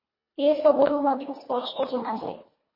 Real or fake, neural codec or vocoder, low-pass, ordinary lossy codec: fake; codec, 24 kHz, 1.5 kbps, HILCodec; 5.4 kHz; MP3, 24 kbps